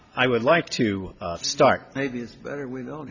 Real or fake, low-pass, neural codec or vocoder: real; 7.2 kHz; none